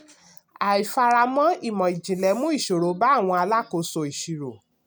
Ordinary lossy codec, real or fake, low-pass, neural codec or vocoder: none; real; none; none